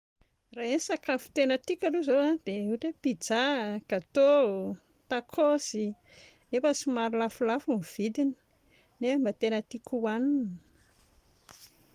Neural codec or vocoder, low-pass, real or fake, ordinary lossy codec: none; 14.4 kHz; real; Opus, 24 kbps